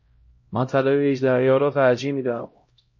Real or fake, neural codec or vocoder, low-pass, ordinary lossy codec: fake; codec, 16 kHz, 0.5 kbps, X-Codec, HuBERT features, trained on LibriSpeech; 7.2 kHz; MP3, 32 kbps